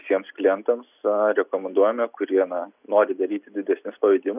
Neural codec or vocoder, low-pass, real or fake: none; 3.6 kHz; real